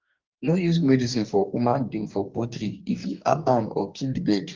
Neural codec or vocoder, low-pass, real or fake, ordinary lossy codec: codec, 44.1 kHz, 2.6 kbps, DAC; 7.2 kHz; fake; Opus, 32 kbps